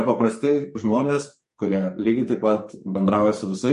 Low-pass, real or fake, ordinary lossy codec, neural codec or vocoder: 14.4 kHz; fake; MP3, 48 kbps; codec, 44.1 kHz, 2.6 kbps, SNAC